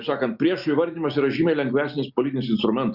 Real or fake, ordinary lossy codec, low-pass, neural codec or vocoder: real; Opus, 64 kbps; 5.4 kHz; none